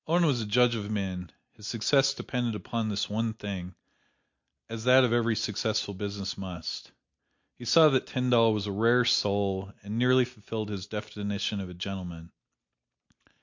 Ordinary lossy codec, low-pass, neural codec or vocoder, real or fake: MP3, 48 kbps; 7.2 kHz; none; real